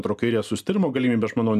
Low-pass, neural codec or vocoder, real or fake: 14.4 kHz; none; real